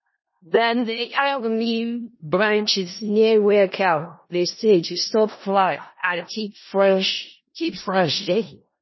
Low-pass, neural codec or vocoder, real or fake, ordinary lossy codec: 7.2 kHz; codec, 16 kHz in and 24 kHz out, 0.4 kbps, LongCat-Audio-Codec, four codebook decoder; fake; MP3, 24 kbps